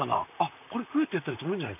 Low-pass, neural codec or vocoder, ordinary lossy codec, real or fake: 3.6 kHz; vocoder, 44.1 kHz, 128 mel bands, Pupu-Vocoder; none; fake